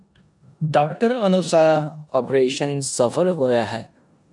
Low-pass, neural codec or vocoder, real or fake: 10.8 kHz; codec, 16 kHz in and 24 kHz out, 0.9 kbps, LongCat-Audio-Codec, four codebook decoder; fake